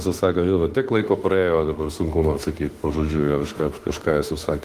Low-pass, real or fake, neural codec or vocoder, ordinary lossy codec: 14.4 kHz; fake; autoencoder, 48 kHz, 32 numbers a frame, DAC-VAE, trained on Japanese speech; Opus, 24 kbps